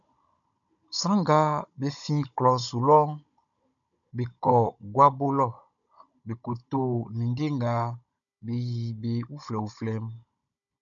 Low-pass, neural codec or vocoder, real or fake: 7.2 kHz; codec, 16 kHz, 16 kbps, FunCodec, trained on Chinese and English, 50 frames a second; fake